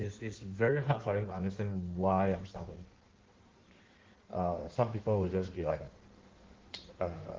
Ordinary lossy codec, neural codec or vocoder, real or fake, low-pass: Opus, 16 kbps; codec, 16 kHz in and 24 kHz out, 1.1 kbps, FireRedTTS-2 codec; fake; 7.2 kHz